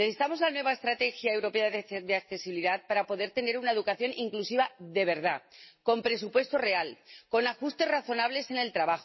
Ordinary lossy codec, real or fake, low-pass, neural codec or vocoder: MP3, 24 kbps; real; 7.2 kHz; none